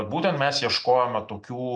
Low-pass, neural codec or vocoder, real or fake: 9.9 kHz; none; real